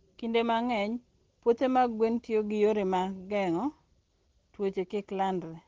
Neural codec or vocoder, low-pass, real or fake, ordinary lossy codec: none; 7.2 kHz; real; Opus, 16 kbps